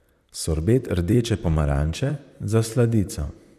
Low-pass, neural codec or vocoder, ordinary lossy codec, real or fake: 14.4 kHz; vocoder, 44.1 kHz, 128 mel bands, Pupu-Vocoder; none; fake